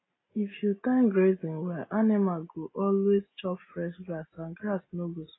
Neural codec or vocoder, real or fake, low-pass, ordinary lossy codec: none; real; 7.2 kHz; AAC, 16 kbps